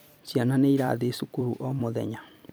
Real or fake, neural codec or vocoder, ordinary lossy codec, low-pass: fake; vocoder, 44.1 kHz, 128 mel bands every 256 samples, BigVGAN v2; none; none